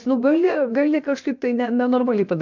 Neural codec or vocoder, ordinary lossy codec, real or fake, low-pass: codec, 16 kHz, 0.7 kbps, FocalCodec; MP3, 64 kbps; fake; 7.2 kHz